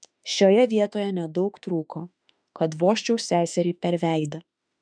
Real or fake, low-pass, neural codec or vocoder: fake; 9.9 kHz; autoencoder, 48 kHz, 32 numbers a frame, DAC-VAE, trained on Japanese speech